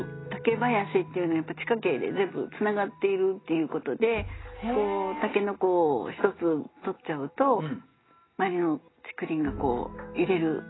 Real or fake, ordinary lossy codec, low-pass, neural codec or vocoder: real; AAC, 16 kbps; 7.2 kHz; none